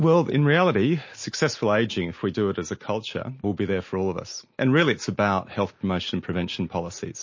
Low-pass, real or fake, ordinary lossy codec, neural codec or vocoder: 7.2 kHz; real; MP3, 32 kbps; none